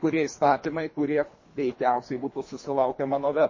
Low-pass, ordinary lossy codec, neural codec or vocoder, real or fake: 7.2 kHz; MP3, 32 kbps; codec, 24 kHz, 3 kbps, HILCodec; fake